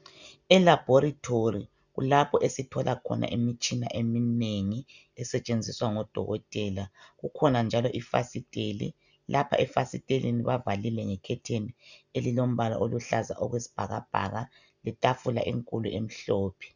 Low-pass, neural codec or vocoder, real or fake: 7.2 kHz; none; real